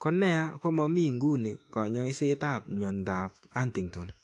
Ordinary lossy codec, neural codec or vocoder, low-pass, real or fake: AAC, 48 kbps; autoencoder, 48 kHz, 32 numbers a frame, DAC-VAE, trained on Japanese speech; 10.8 kHz; fake